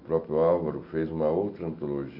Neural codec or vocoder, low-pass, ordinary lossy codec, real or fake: none; 5.4 kHz; none; real